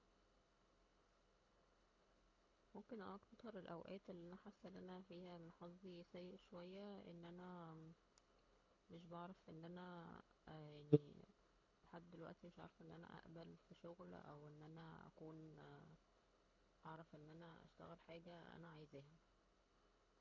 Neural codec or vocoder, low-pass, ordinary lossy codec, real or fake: codec, 24 kHz, 6 kbps, HILCodec; 7.2 kHz; none; fake